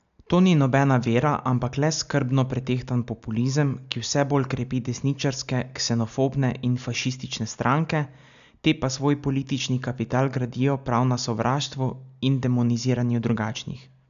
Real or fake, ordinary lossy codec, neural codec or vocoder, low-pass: real; none; none; 7.2 kHz